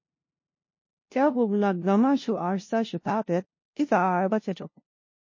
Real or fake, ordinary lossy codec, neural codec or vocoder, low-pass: fake; MP3, 32 kbps; codec, 16 kHz, 0.5 kbps, FunCodec, trained on LibriTTS, 25 frames a second; 7.2 kHz